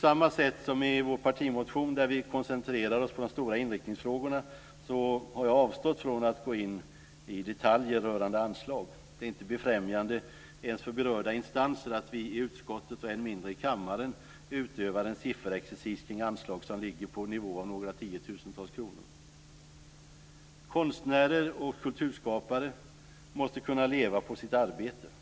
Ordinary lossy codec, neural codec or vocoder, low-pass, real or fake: none; none; none; real